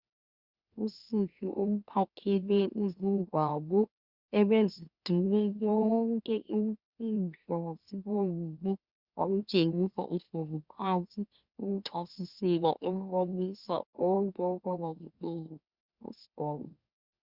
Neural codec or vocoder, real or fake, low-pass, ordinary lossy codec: autoencoder, 44.1 kHz, a latent of 192 numbers a frame, MeloTTS; fake; 5.4 kHz; Opus, 64 kbps